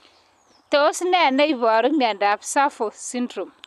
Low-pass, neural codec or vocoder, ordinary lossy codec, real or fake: 14.4 kHz; none; none; real